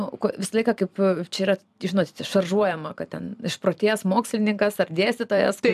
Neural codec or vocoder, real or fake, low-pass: vocoder, 44.1 kHz, 128 mel bands every 256 samples, BigVGAN v2; fake; 14.4 kHz